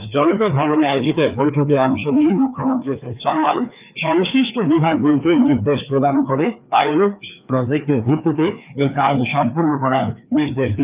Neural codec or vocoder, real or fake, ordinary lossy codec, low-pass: codec, 16 kHz, 2 kbps, FreqCodec, larger model; fake; Opus, 24 kbps; 3.6 kHz